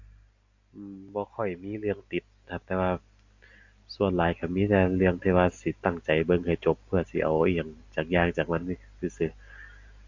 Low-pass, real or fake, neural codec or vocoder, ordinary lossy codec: 7.2 kHz; real; none; AAC, 48 kbps